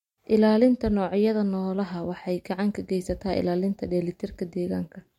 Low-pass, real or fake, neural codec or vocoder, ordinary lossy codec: 19.8 kHz; real; none; MP3, 64 kbps